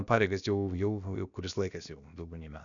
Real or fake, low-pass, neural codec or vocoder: fake; 7.2 kHz; codec, 16 kHz, about 1 kbps, DyCAST, with the encoder's durations